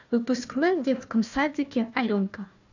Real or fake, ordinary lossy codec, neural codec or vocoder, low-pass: fake; none; codec, 16 kHz, 1 kbps, FunCodec, trained on Chinese and English, 50 frames a second; 7.2 kHz